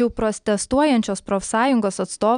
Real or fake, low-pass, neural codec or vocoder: real; 9.9 kHz; none